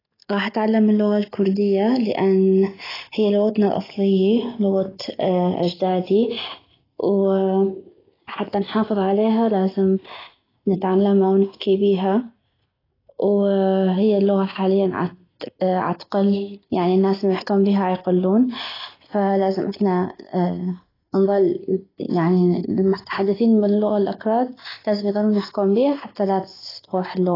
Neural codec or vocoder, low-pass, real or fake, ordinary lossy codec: none; 5.4 kHz; real; AAC, 24 kbps